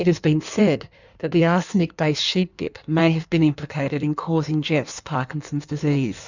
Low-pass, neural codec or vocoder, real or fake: 7.2 kHz; codec, 16 kHz in and 24 kHz out, 1.1 kbps, FireRedTTS-2 codec; fake